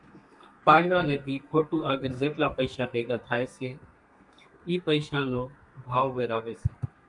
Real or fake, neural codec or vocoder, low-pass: fake; codec, 32 kHz, 1.9 kbps, SNAC; 10.8 kHz